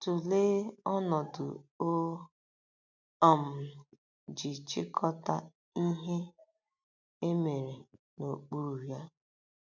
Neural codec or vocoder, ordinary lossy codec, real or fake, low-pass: none; none; real; 7.2 kHz